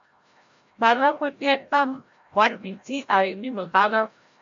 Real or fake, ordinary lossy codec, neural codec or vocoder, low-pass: fake; MP3, 48 kbps; codec, 16 kHz, 0.5 kbps, FreqCodec, larger model; 7.2 kHz